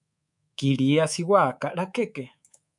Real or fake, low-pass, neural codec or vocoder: fake; 10.8 kHz; codec, 24 kHz, 3.1 kbps, DualCodec